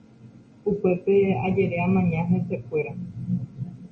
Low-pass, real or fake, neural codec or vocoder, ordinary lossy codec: 9.9 kHz; real; none; MP3, 32 kbps